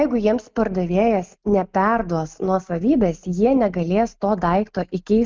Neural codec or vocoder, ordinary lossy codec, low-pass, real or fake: none; Opus, 24 kbps; 7.2 kHz; real